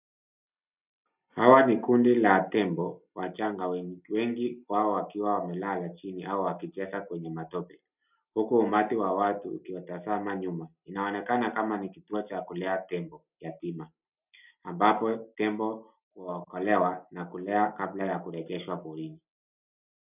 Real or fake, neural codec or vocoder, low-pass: real; none; 3.6 kHz